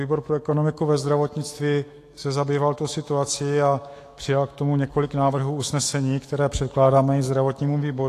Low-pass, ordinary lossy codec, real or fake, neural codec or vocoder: 14.4 kHz; AAC, 48 kbps; fake; autoencoder, 48 kHz, 128 numbers a frame, DAC-VAE, trained on Japanese speech